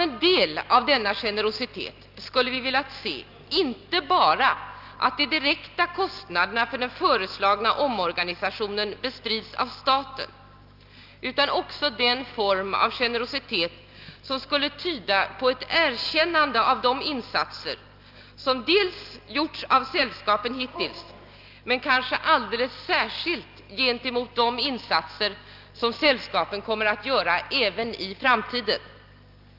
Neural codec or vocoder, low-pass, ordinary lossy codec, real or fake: none; 5.4 kHz; Opus, 32 kbps; real